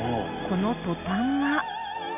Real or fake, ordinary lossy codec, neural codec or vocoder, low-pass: real; none; none; 3.6 kHz